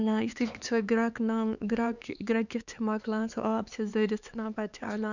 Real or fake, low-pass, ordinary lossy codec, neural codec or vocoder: fake; 7.2 kHz; none; codec, 16 kHz, 4 kbps, X-Codec, HuBERT features, trained on LibriSpeech